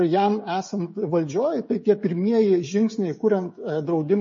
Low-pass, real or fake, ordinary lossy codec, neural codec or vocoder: 7.2 kHz; fake; MP3, 32 kbps; codec, 16 kHz, 16 kbps, FreqCodec, smaller model